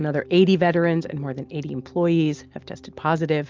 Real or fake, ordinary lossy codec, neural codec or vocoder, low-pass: real; Opus, 32 kbps; none; 7.2 kHz